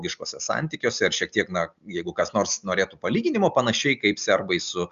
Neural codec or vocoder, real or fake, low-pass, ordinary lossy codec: none; real; 7.2 kHz; Opus, 64 kbps